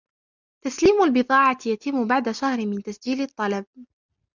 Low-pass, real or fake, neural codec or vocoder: 7.2 kHz; real; none